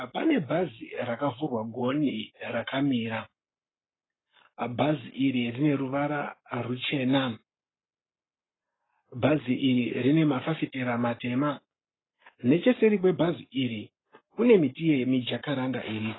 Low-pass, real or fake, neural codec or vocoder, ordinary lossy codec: 7.2 kHz; fake; codec, 44.1 kHz, 7.8 kbps, Pupu-Codec; AAC, 16 kbps